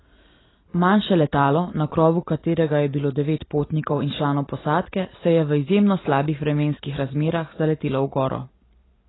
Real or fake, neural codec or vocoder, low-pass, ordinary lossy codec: real; none; 7.2 kHz; AAC, 16 kbps